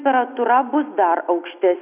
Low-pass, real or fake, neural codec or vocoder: 3.6 kHz; real; none